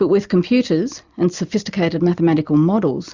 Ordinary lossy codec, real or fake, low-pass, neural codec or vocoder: Opus, 64 kbps; real; 7.2 kHz; none